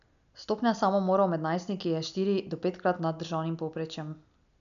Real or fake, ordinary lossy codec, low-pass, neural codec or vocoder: real; none; 7.2 kHz; none